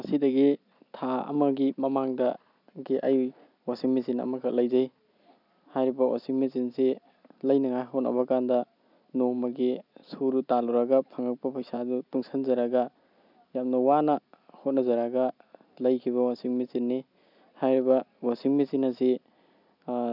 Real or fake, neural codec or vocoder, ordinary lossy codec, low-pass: real; none; none; 5.4 kHz